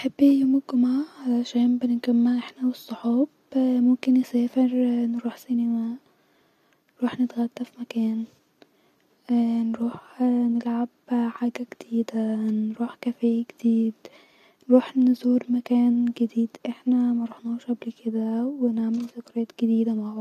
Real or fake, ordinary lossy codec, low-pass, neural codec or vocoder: real; AAC, 96 kbps; 14.4 kHz; none